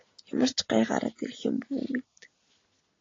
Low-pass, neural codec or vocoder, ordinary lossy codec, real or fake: 7.2 kHz; none; AAC, 32 kbps; real